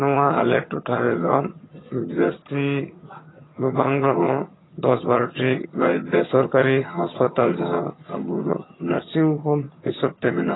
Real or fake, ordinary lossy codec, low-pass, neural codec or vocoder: fake; AAC, 16 kbps; 7.2 kHz; vocoder, 22.05 kHz, 80 mel bands, HiFi-GAN